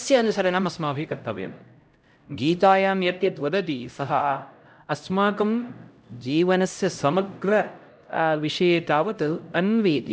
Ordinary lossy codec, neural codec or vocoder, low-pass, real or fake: none; codec, 16 kHz, 0.5 kbps, X-Codec, HuBERT features, trained on LibriSpeech; none; fake